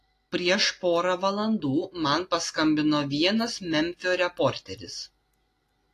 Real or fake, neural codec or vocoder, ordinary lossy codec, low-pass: real; none; AAC, 48 kbps; 14.4 kHz